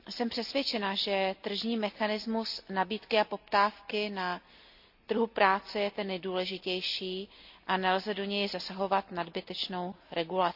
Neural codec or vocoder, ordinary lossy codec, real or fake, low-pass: none; none; real; 5.4 kHz